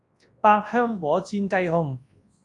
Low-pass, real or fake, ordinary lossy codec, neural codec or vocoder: 10.8 kHz; fake; AAC, 48 kbps; codec, 24 kHz, 0.9 kbps, WavTokenizer, large speech release